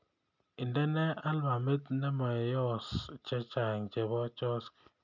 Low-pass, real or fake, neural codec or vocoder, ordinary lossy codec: 7.2 kHz; real; none; none